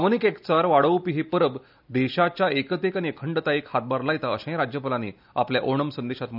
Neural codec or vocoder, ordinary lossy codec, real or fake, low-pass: none; none; real; 5.4 kHz